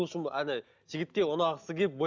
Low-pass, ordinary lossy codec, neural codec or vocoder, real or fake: 7.2 kHz; none; none; real